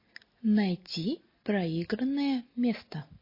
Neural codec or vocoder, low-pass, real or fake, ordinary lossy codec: none; 5.4 kHz; real; MP3, 24 kbps